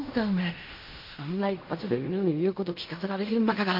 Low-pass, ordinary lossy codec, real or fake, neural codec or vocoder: 5.4 kHz; MP3, 32 kbps; fake; codec, 16 kHz in and 24 kHz out, 0.4 kbps, LongCat-Audio-Codec, fine tuned four codebook decoder